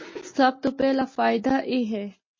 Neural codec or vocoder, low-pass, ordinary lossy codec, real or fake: none; 7.2 kHz; MP3, 32 kbps; real